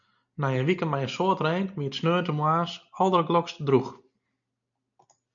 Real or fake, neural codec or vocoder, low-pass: real; none; 7.2 kHz